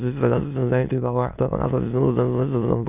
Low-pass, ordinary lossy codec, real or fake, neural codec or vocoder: 3.6 kHz; none; fake; autoencoder, 22.05 kHz, a latent of 192 numbers a frame, VITS, trained on many speakers